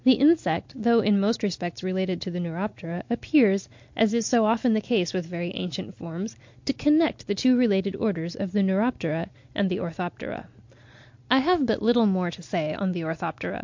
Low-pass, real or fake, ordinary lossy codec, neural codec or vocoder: 7.2 kHz; real; MP3, 48 kbps; none